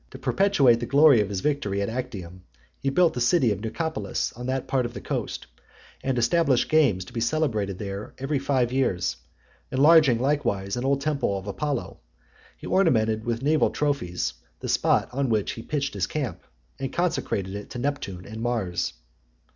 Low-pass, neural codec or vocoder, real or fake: 7.2 kHz; none; real